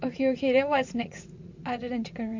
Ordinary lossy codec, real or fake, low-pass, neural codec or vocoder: MP3, 48 kbps; fake; 7.2 kHz; vocoder, 44.1 kHz, 80 mel bands, Vocos